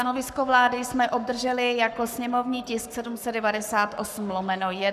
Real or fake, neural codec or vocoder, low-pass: fake; codec, 44.1 kHz, 7.8 kbps, Pupu-Codec; 14.4 kHz